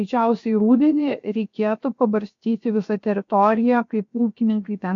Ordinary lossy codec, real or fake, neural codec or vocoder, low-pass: MP3, 48 kbps; fake; codec, 16 kHz, 0.7 kbps, FocalCodec; 7.2 kHz